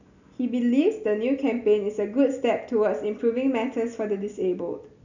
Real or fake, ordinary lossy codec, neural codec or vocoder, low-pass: real; none; none; 7.2 kHz